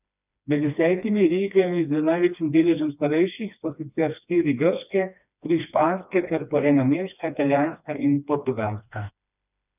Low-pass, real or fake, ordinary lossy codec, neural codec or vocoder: 3.6 kHz; fake; none; codec, 16 kHz, 2 kbps, FreqCodec, smaller model